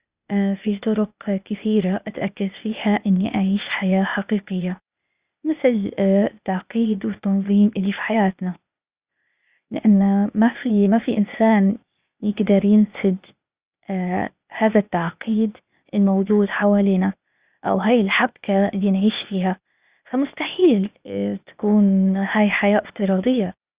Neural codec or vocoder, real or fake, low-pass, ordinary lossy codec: codec, 16 kHz, 0.8 kbps, ZipCodec; fake; 3.6 kHz; Opus, 64 kbps